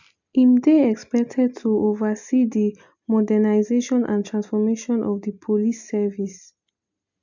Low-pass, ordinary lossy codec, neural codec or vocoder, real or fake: 7.2 kHz; none; none; real